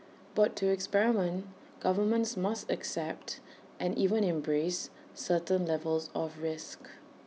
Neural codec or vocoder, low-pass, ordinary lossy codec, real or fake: none; none; none; real